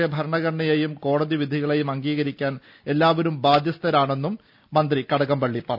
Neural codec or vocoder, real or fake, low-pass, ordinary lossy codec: none; real; 5.4 kHz; none